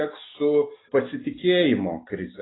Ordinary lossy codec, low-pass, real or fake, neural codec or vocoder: AAC, 16 kbps; 7.2 kHz; real; none